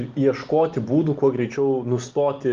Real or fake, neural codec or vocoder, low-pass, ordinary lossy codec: real; none; 7.2 kHz; Opus, 32 kbps